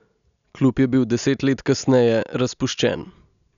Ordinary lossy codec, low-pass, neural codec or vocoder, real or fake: none; 7.2 kHz; none; real